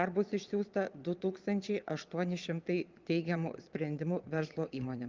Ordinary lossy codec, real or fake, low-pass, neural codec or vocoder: Opus, 32 kbps; fake; 7.2 kHz; vocoder, 22.05 kHz, 80 mel bands, Vocos